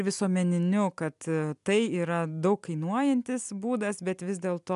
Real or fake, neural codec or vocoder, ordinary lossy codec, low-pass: real; none; MP3, 96 kbps; 10.8 kHz